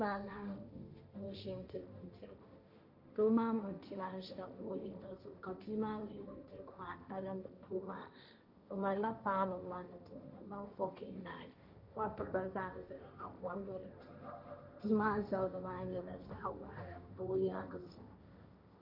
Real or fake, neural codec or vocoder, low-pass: fake; codec, 16 kHz, 1.1 kbps, Voila-Tokenizer; 5.4 kHz